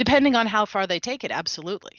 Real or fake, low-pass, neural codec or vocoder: real; 7.2 kHz; none